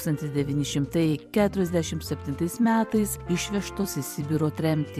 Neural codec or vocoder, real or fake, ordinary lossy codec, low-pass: vocoder, 44.1 kHz, 128 mel bands every 256 samples, BigVGAN v2; fake; MP3, 96 kbps; 14.4 kHz